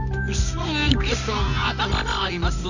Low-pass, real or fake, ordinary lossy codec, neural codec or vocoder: 7.2 kHz; fake; none; codec, 24 kHz, 0.9 kbps, WavTokenizer, medium music audio release